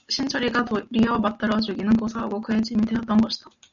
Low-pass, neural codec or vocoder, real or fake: 7.2 kHz; none; real